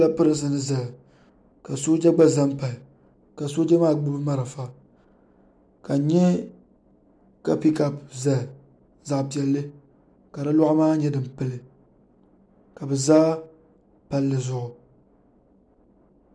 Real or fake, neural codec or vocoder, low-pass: real; none; 9.9 kHz